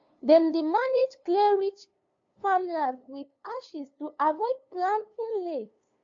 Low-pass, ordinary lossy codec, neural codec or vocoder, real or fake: 7.2 kHz; AAC, 64 kbps; codec, 16 kHz, 2 kbps, FunCodec, trained on LibriTTS, 25 frames a second; fake